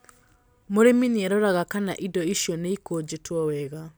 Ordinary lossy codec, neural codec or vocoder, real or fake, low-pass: none; none; real; none